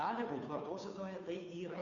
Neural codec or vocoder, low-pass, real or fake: codec, 16 kHz, 2 kbps, FunCodec, trained on Chinese and English, 25 frames a second; 7.2 kHz; fake